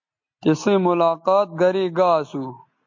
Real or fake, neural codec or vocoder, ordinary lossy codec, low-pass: real; none; MP3, 48 kbps; 7.2 kHz